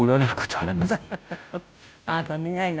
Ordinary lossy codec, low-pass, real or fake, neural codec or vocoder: none; none; fake; codec, 16 kHz, 0.5 kbps, FunCodec, trained on Chinese and English, 25 frames a second